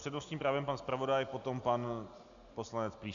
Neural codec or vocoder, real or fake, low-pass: none; real; 7.2 kHz